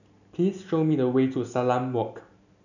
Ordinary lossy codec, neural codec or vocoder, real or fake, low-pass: none; none; real; 7.2 kHz